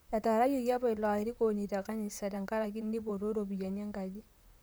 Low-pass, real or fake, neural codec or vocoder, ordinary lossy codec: none; fake; vocoder, 44.1 kHz, 128 mel bands, Pupu-Vocoder; none